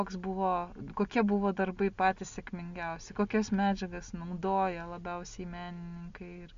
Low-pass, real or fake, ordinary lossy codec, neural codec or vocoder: 7.2 kHz; real; MP3, 64 kbps; none